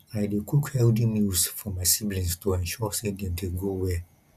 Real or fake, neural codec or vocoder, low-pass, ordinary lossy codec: real; none; 14.4 kHz; none